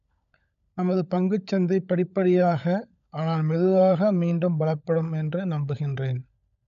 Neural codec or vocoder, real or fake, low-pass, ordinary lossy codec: codec, 16 kHz, 16 kbps, FunCodec, trained on LibriTTS, 50 frames a second; fake; 7.2 kHz; none